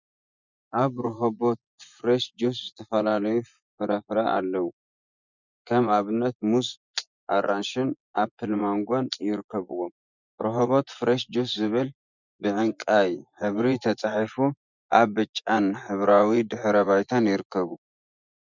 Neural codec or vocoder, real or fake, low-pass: vocoder, 24 kHz, 100 mel bands, Vocos; fake; 7.2 kHz